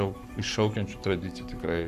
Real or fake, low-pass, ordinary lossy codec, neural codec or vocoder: fake; 14.4 kHz; AAC, 96 kbps; codec, 44.1 kHz, 7.8 kbps, Pupu-Codec